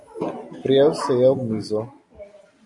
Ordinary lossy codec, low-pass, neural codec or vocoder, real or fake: AAC, 64 kbps; 10.8 kHz; none; real